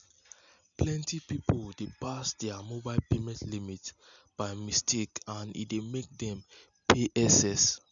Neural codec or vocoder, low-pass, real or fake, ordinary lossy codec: none; 7.2 kHz; real; none